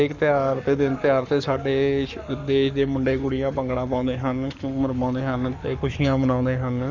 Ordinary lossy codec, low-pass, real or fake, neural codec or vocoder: none; 7.2 kHz; fake; codec, 44.1 kHz, 7.8 kbps, Pupu-Codec